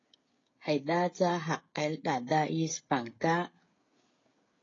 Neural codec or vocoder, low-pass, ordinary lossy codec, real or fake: codec, 16 kHz, 16 kbps, FreqCodec, smaller model; 7.2 kHz; AAC, 32 kbps; fake